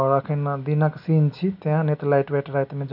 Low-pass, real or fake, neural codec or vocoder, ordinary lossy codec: 5.4 kHz; real; none; none